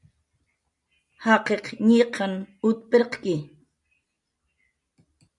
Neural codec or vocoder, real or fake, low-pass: none; real; 10.8 kHz